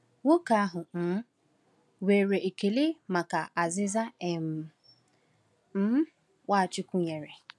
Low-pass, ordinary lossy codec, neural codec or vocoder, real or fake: none; none; none; real